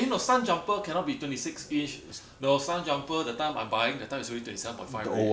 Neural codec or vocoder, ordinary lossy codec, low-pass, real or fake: none; none; none; real